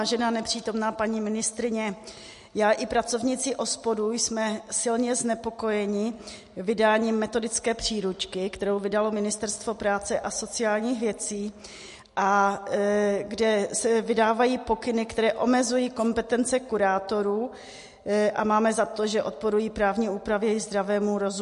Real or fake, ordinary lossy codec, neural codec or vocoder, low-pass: real; MP3, 48 kbps; none; 14.4 kHz